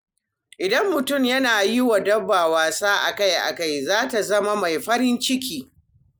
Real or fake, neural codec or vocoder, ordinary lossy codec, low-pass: real; none; none; none